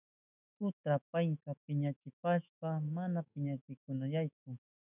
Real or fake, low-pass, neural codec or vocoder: fake; 3.6 kHz; autoencoder, 48 kHz, 128 numbers a frame, DAC-VAE, trained on Japanese speech